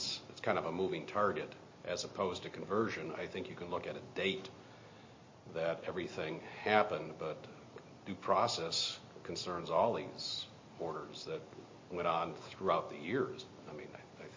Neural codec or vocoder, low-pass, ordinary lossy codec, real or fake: none; 7.2 kHz; MP3, 32 kbps; real